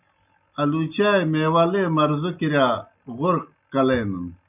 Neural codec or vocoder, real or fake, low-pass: none; real; 3.6 kHz